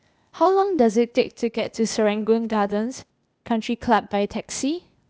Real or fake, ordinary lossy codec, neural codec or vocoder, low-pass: fake; none; codec, 16 kHz, 0.8 kbps, ZipCodec; none